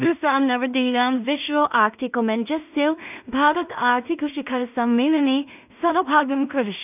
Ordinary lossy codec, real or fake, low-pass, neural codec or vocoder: none; fake; 3.6 kHz; codec, 16 kHz in and 24 kHz out, 0.4 kbps, LongCat-Audio-Codec, two codebook decoder